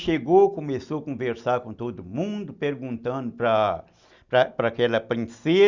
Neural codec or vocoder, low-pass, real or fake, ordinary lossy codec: none; 7.2 kHz; real; Opus, 64 kbps